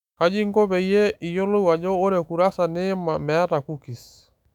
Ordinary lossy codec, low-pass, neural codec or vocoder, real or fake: none; 19.8 kHz; autoencoder, 48 kHz, 128 numbers a frame, DAC-VAE, trained on Japanese speech; fake